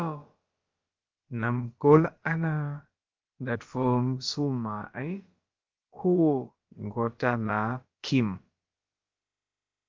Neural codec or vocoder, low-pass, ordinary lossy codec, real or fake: codec, 16 kHz, about 1 kbps, DyCAST, with the encoder's durations; 7.2 kHz; Opus, 32 kbps; fake